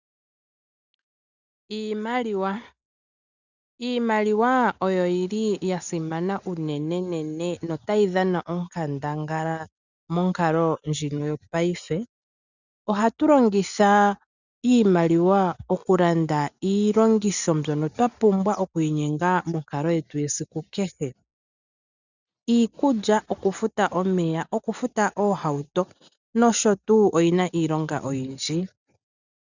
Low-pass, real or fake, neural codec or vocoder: 7.2 kHz; real; none